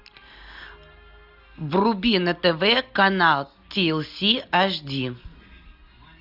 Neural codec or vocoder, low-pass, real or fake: none; 5.4 kHz; real